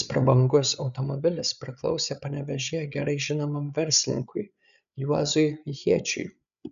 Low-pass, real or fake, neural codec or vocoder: 7.2 kHz; fake; codec, 16 kHz, 8 kbps, FreqCodec, larger model